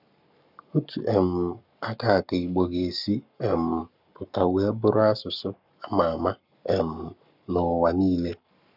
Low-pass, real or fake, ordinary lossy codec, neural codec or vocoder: 5.4 kHz; fake; none; codec, 44.1 kHz, 7.8 kbps, Pupu-Codec